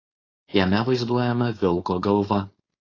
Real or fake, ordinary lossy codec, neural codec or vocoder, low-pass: fake; AAC, 32 kbps; codec, 16 kHz, 4.8 kbps, FACodec; 7.2 kHz